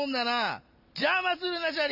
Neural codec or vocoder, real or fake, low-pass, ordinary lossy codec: none; real; 5.4 kHz; none